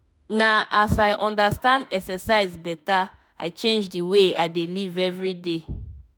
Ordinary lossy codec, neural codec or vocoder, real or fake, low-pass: none; autoencoder, 48 kHz, 32 numbers a frame, DAC-VAE, trained on Japanese speech; fake; none